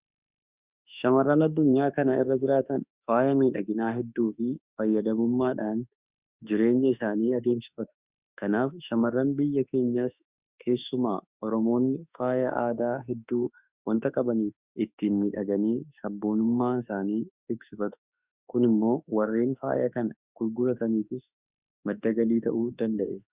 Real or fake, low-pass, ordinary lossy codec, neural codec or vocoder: fake; 3.6 kHz; Opus, 64 kbps; autoencoder, 48 kHz, 32 numbers a frame, DAC-VAE, trained on Japanese speech